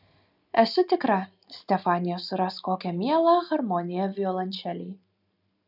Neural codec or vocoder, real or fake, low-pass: none; real; 5.4 kHz